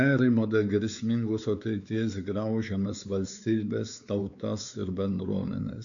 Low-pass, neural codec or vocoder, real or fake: 7.2 kHz; codec, 16 kHz, 4 kbps, X-Codec, WavLM features, trained on Multilingual LibriSpeech; fake